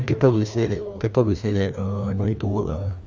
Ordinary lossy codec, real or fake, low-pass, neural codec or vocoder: none; fake; none; codec, 16 kHz, 2 kbps, FreqCodec, larger model